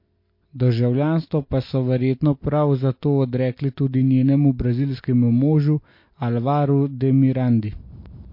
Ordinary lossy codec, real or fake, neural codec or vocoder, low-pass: MP3, 32 kbps; real; none; 5.4 kHz